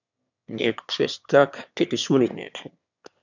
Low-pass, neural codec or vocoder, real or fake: 7.2 kHz; autoencoder, 22.05 kHz, a latent of 192 numbers a frame, VITS, trained on one speaker; fake